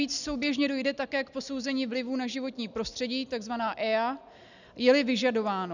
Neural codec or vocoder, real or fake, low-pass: none; real; 7.2 kHz